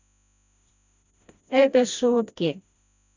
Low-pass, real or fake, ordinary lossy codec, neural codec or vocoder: 7.2 kHz; fake; none; codec, 16 kHz, 1 kbps, FreqCodec, smaller model